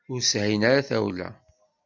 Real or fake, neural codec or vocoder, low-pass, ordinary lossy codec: real; none; 7.2 kHz; MP3, 64 kbps